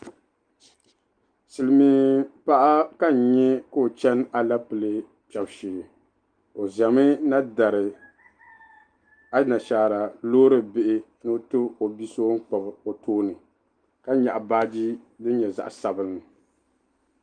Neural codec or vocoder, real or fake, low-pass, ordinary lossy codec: none; real; 9.9 kHz; Opus, 32 kbps